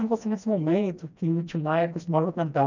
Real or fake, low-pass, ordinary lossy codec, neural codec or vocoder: fake; 7.2 kHz; none; codec, 16 kHz, 1 kbps, FreqCodec, smaller model